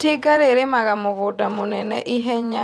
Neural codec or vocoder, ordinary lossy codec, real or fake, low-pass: vocoder, 22.05 kHz, 80 mel bands, WaveNeXt; none; fake; none